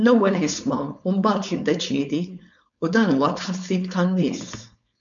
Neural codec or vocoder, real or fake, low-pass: codec, 16 kHz, 4.8 kbps, FACodec; fake; 7.2 kHz